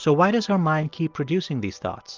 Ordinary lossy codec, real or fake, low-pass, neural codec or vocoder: Opus, 24 kbps; real; 7.2 kHz; none